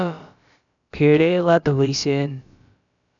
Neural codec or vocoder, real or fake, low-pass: codec, 16 kHz, about 1 kbps, DyCAST, with the encoder's durations; fake; 7.2 kHz